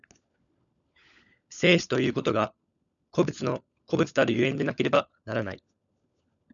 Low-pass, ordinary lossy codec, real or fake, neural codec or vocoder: 7.2 kHz; MP3, 96 kbps; fake; codec, 16 kHz, 16 kbps, FunCodec, trained on LibriTTS, 50 frames a second